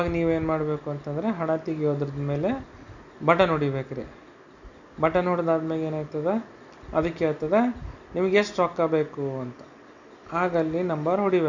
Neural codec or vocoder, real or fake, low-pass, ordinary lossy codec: none; real; 7.2 kHz; none